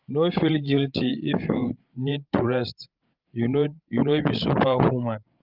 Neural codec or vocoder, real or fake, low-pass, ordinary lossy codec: codec, 16 kHz, 16 kbps, FreqCodec, larger model; fake; 5.4 kHz; Opus, 32 kbps